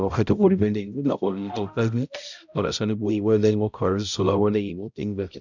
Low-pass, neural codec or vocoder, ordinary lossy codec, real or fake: 7.2 kHz; codec, 16 kHz, 0.5 kbps, X-Codec, HuBERT features, trained on balanced general audio; none; fake